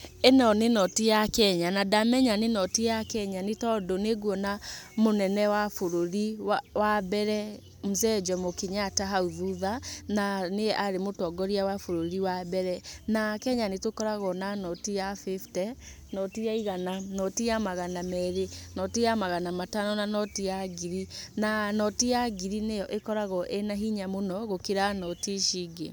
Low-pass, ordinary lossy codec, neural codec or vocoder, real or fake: none; none; none; real